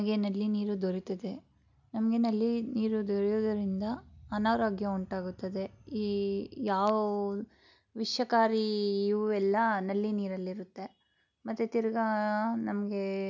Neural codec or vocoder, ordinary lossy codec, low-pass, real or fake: none; none; 7.2 kHz; real